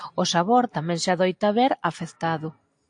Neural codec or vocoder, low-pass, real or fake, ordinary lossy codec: none; 9.9 kHz; real; AAC, 64 kbps